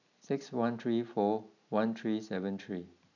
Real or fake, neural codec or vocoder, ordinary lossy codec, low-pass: real; none; none; 7.2 kHz